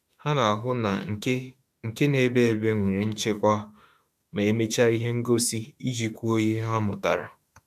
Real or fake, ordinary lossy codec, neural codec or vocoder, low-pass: fake; AAC, 96 kbps; autoencoder, 48 kHz, 32 numbers a frame, DAC-VAE, trained on Japanese speech; 14.4 kHz